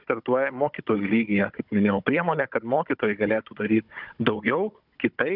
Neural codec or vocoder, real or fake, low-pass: codec, 16 kHz, 16 kbps, FunCodec, trained on Chinese and English, 50 frames a second; fake; 5.4 kHz